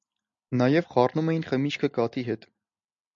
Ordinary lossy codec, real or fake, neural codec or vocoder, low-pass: MP3, 64 kbps; real; none; 7.2 kHz